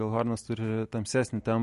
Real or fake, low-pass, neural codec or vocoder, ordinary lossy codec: real; 14.4 kHz; none; MP3, 48 kbps